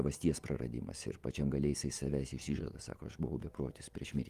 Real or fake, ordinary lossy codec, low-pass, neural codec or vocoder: fake; Opus, 32 kbps; 14.4 kHz; vocoder, 48 kHz, 128 mel bands, Vocos